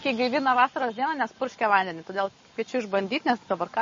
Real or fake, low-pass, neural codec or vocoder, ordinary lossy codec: real; 7.2 kHz; none; MP3, 32 kbps